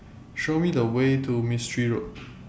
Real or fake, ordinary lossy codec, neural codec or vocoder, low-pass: real; none; none; none